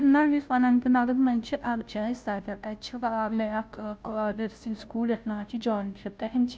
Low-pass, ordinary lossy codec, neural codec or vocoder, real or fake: none; none; codec, 16 kHz, 0.5 kbps, FunCodec, trained on Chinese and English, 25 frames a second; fake